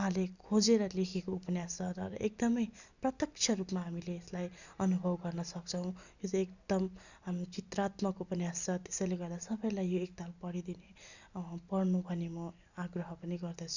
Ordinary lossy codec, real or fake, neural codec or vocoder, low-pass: none; real; none; 7.2 kHz